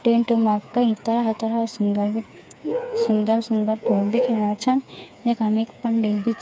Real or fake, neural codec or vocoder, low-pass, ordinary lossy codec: fake; codec, 16 kHz, 4 kbps, FreqCodec, smaller model; none; none